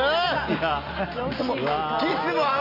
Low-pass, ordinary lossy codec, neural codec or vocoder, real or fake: 5.4 kHz; none; none; real